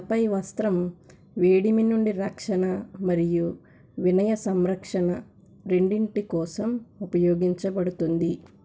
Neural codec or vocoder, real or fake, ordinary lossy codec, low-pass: none; real; none; none